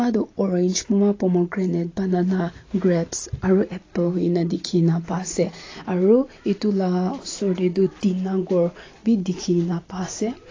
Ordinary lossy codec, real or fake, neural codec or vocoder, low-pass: AAC, 32 kbps; real; none; 7.2 kHz